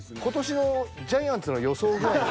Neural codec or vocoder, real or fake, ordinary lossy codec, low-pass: none; real; none; none